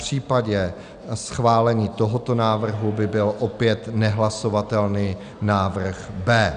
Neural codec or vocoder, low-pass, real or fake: none; 9.9 kHz; real